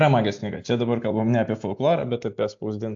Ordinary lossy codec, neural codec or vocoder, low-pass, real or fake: AAC, 64 kbps; none; 7.2 kHz; real